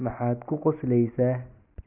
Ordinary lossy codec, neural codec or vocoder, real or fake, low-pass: none; none; real; 3.6 kHz